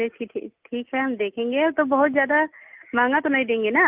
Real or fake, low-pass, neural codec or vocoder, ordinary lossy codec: real; 3.6 kHz; none; Opus, 32 kbps